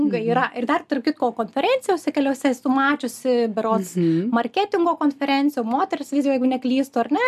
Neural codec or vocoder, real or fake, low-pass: none; real; 14.4 kHz